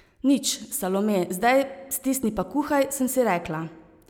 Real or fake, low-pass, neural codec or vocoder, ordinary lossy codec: real; none; none; none